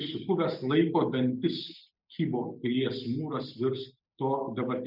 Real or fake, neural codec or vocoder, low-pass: real; none; 5.4 kHz